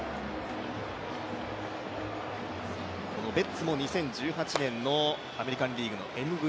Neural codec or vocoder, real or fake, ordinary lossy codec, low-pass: none; real; none; none